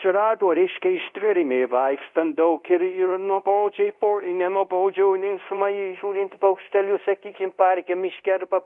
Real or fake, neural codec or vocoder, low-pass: fake; codec, 24 kHz, 0.5 kbps, DualCodec; 10.8 kHz